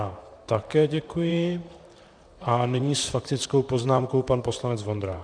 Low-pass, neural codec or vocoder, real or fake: 9.9 kHz; vocoder, 44.1 kHz, 128 mel bands, Pupu-Vocoder; fake